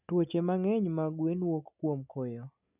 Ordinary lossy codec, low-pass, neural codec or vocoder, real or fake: none; 3.6 kHz; none; real